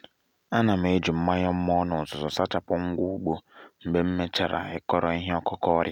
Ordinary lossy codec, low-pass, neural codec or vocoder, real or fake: none; 19.8 kHz; none; real